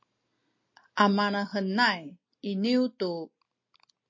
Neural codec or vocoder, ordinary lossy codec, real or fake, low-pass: none; MP3, 32 kbps; real; 7.2 kHz